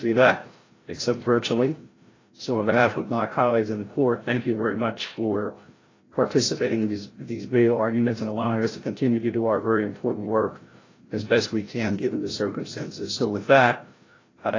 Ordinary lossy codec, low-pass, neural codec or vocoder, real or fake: AAC, 32 kbps; 7.2 kHz; codec, 16 kHz, 0.5 kbps, FreqCodec, larger model; fake